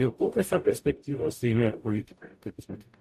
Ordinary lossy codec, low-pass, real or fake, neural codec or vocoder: AAC, 96 kbps; 14.4 kHz; fake; codec, 44.1 kHz, 0.9 kbps, DAC